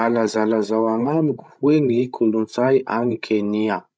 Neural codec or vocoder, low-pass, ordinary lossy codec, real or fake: codec, 16 kHz, 16 kbps, FreqCodec, larger model; none; none; fake